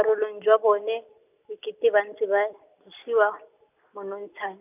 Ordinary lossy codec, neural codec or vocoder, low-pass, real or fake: none; none; 3.6 kHz; real